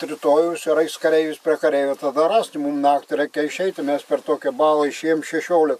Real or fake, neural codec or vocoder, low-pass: real; none; 14.4 kHz